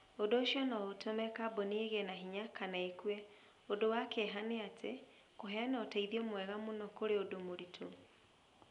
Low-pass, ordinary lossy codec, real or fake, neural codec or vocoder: 10.8 kHz; none; real; none